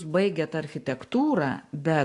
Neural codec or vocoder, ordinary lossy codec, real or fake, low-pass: codec, 44.1 kHz, 7.8 kbps, Pupu-Codec; AAC, 64 kbps; fake; 10.8 kHz